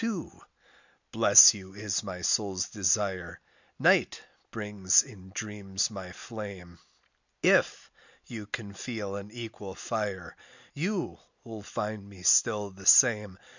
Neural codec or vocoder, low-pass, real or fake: none; 7.2 kHz; real